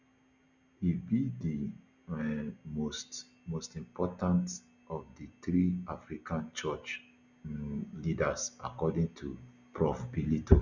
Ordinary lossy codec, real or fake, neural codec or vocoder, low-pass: none; real; none; 7.2 kHz